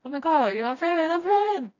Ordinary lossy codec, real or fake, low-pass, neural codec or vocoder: none; fake; 7.2 kHz; codec, 16 kHz, 1 kbps, FreqCodec, smaller model